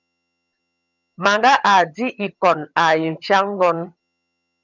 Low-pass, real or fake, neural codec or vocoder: 7.2 kHz; fake; vocoder, 22.05 kHz, 80 mel bands, HiFi-GAN